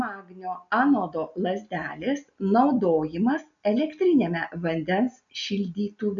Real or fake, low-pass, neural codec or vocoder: real; 7.2 kHz; none